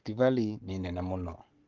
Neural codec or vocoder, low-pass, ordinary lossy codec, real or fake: codec, 44.1 kHz, 7.8 kbps, Pupu-Codec; 7.2 kHz; Opus, 16 kbps; fake